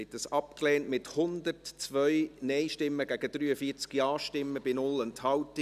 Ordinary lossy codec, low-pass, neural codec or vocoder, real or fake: none; 14.4 kHz; none; real